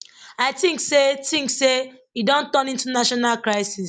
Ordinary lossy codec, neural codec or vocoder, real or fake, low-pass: none; none; real; 9.9 kHz